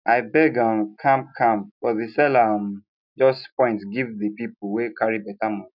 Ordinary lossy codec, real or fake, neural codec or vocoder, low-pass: none; real; none; 5.4 kHz